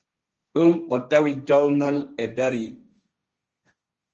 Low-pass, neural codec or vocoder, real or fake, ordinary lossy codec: 7.2 kHz; codec, 16 kHz, 1.1 kbps, Voila-Tokenizer; fake; Opus, 24 kbps